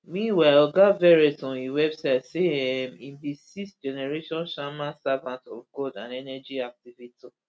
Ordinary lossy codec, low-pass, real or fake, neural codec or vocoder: none; none; real; none